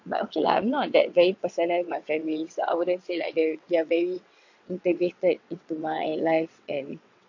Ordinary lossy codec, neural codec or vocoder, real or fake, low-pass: AAC, 48 kbps; vocoder, 22.05 kHz, 80 mel bands, WaveNeXt; fake; 7.2 kHz